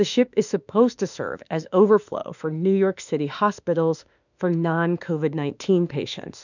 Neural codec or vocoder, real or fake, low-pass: autoencoder, 48 kHz, 32 numbers a frame, DAC-VAE, trained on Japanese speech; fake; 7.2 kHz